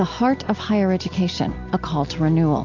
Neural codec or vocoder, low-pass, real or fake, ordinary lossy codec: none; 7.2 kHz; real; AAC, 48 kbps